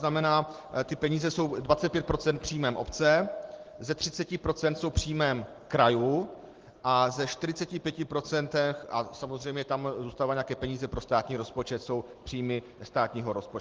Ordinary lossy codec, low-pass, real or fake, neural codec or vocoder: Opus, 16 kbps; 7.2 kHz; real; none